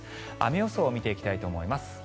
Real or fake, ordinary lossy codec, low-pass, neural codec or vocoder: real; none; none; none